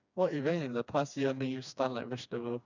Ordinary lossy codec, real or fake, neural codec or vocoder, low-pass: none; fake; codec, 16 kHz, 2 kbps, FreqCodec, smaller model; 7.2 kHz